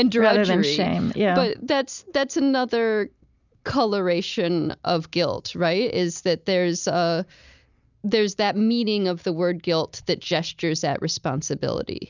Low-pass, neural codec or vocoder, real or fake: 7.2 kHz; none; real